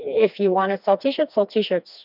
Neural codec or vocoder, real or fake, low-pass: codec, 16 kHz, 2 kbps, FreqCodec, smaller model; fake; 5.4 kHz